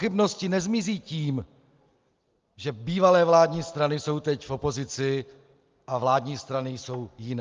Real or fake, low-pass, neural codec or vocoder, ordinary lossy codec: real; 7.2 kHz; none; Opus, 32 kbps